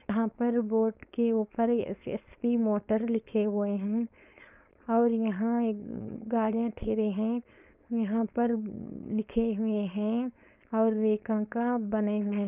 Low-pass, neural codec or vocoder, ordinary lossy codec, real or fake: 3.6 kHz; codec, 16 kHz, 4.8 kbps, FACodec; none; fake